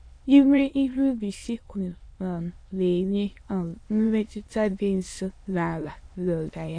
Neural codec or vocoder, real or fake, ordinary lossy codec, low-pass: autoencoder, 22.05 kHz, a latent of 192 numbers a frame, VITS, trained on many speakers; fake; AAC, 48 kbps; 9.9 kHz